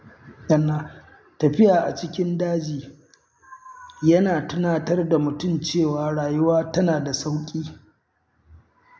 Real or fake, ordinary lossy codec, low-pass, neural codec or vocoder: real; none; none; none